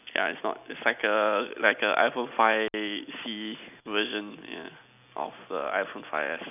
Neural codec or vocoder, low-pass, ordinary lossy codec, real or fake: none; 3.6 kHz; none; real